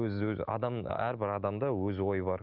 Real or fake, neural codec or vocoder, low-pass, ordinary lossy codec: real; none; 5.4 kHz; Opus, 32 kbps